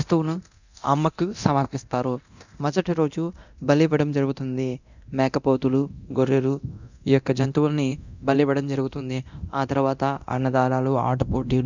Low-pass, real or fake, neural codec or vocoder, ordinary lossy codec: 7.2 kHz; fake; codec, 24 kHz, 0.9 kbps, DualCodec; none